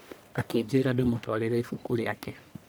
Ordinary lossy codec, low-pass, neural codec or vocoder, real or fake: none; none; codec, 44.1 kHz, 1.7 kbps, Pupu-Codec; fake